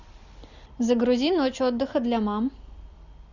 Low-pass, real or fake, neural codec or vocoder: 7.2 kHz; real; none